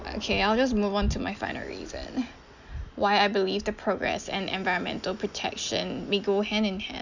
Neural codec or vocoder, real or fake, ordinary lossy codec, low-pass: none; real; Opus, 64 kbps; 7.2 kHz